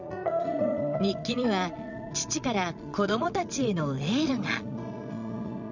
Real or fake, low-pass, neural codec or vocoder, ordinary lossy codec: fake; 7.2 kHz; vocoder, 22.05 kHz, 80 mel bands, Vocos; none